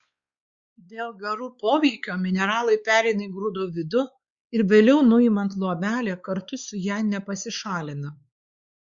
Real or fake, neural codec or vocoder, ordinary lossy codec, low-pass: fake; codec, 16 kHz, 4 kbps, X-Codec, WavLM features, trained on Multilingual LibriSpeech; Opus, 64 kbps; 7.2 kHz